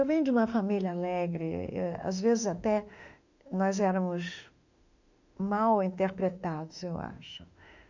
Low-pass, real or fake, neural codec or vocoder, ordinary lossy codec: 7.2 kHz; fake; autoencoder, 48 kHz, 32 numbers a frame, DAC-VAE, trained on Japanese speech; none